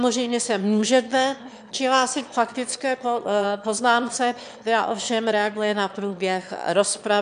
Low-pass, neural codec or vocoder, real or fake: 9.9 kHz; autoencoder, 22.05 kHz, a latent of 192 numbers a frame, VITS, trained on one speaker; fake